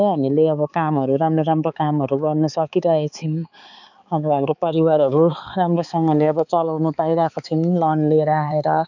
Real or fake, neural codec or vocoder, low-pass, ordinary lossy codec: fake; codec, 16 kHz, 4 kbps, X-Codec, HuBERT features, trained on balanced general audio; 7.2 kHz; none